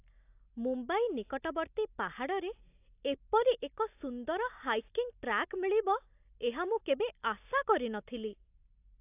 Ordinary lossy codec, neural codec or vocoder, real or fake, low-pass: AAC, 32 kbps; none; real; 3.6 kHz